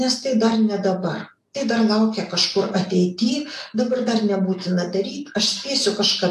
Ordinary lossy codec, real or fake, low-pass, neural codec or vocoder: AAC, 64 kbps; real; 14.4 kHz; none